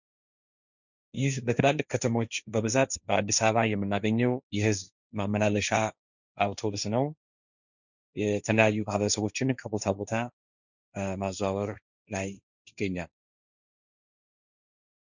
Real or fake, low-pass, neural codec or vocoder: fake; 7.2 kHz; codec, 16 kHz, 1.1 kbps, Voila-Tokenizer